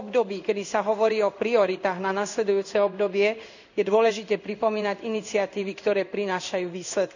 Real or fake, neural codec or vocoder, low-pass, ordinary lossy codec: fake; codec, 16 kHz in and 24 kHz out, 1 kbps, XY-Tokenizer; 7.2 kHz; none